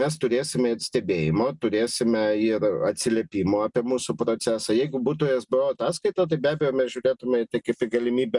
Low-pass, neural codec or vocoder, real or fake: 10.8 kHz; none; real